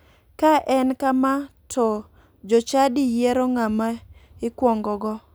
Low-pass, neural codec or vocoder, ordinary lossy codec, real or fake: none; none; none; real